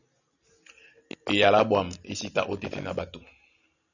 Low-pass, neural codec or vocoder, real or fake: 7.2 kHz; none; real